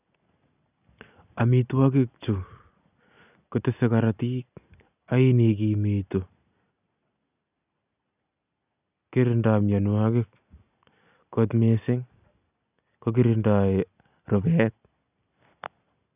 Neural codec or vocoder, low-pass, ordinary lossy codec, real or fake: none; 3.6 kHz; none; real